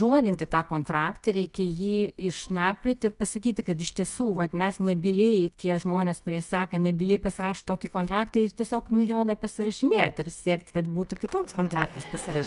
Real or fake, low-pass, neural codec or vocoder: fake; 10.8 kHz; codec, 24 kHz, 0.9 kbps, WavTokenizer, medium music audio release